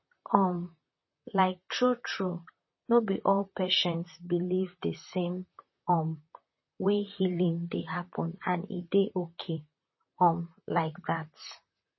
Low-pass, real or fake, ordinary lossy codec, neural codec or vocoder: 7.2 kHz; fake; MP3, 24 kbps; vocoder, 44.1 kHz, 128 mel bands, Pupu-Vocoder